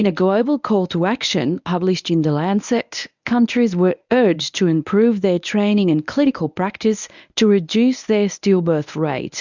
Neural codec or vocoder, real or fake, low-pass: codec, 24 kHz, 0.9 kbps, WavTokenizer, medium speech release version 1; fake; 7.2 kHz